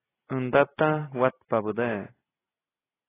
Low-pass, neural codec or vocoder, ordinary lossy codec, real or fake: 3.6 kHz; none; AAC, 16 kbps; real